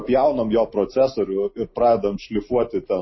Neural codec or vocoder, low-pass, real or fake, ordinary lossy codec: none; 7.2 kHz; real; MP3, 24 kbps